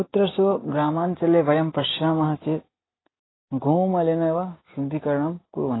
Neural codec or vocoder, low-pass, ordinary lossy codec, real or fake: none; 7.2 kHz; AAC, 16 kbps; real